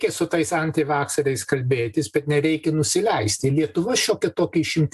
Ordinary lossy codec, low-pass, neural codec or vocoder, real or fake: AAC, 96 kbps; 14.4 kHz; none; real